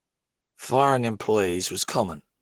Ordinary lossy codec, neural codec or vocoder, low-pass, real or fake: Opus, 16 kbps; codec, 44.1 kHz, 7.8 kbps, Pupu-Codec; 19.8 kHz; fake